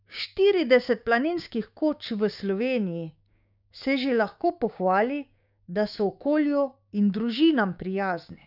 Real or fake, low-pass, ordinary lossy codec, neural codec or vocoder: fake; 5.4 kHz; none; codec, 16 kHz, 6 kbps, DAC